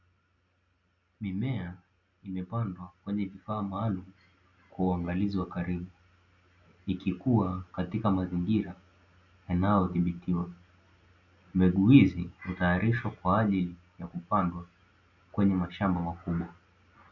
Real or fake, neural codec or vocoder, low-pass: real; none; 7.2 kHz